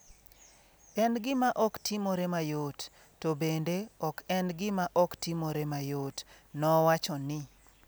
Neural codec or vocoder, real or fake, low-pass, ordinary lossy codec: none; real; none; none